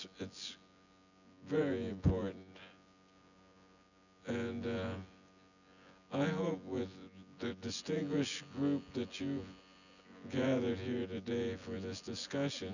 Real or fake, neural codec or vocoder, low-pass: fake; vocoder, 24 kHz, 100 mel bands, Vocos; 7.2 kHz